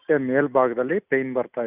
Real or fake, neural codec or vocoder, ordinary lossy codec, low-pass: real; none; none; 3.6 kHz